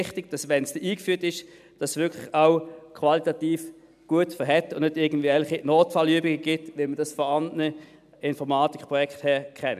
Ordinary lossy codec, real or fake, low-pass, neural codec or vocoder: none; real; 14.4 kHz; none